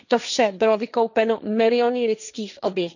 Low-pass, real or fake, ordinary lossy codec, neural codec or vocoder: 7.2 kHz; fake; none; codec, 16 kHz, 1.1 kbps, Voila-Tokenizer